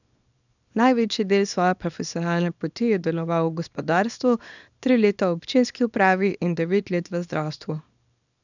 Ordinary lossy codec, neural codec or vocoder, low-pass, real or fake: none; codec, 24 kHz, 0.9 kbps, WavTokenizer, small release; 7.2 kHz; fake